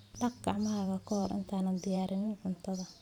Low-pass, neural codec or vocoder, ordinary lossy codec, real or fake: 19.8 kHz; vocoder, 44.1 kHz, 128 mel bands every 512 samples, BigVGAN v2; none; fake